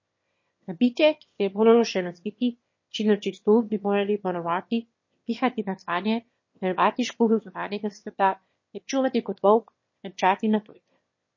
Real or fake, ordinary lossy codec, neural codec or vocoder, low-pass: fake; MP3, 32 kbps; autoencoder, 22.05 kHz, a latent of 192 numbers a frame, VITS, trained on one speaker; 7.2 kHz